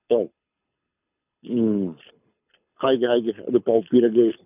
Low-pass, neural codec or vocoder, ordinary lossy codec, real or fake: 3.6 kHz; codec, 24 kHz, 6 kbps, HILCodec; none; fake